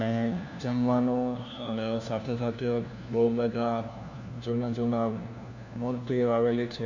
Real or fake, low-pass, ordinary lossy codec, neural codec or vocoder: fake; 7.2 kHz; none; codec, 16 kHz, 1 kbps, FunCodec, trained on LibriTTS, 50 frames a second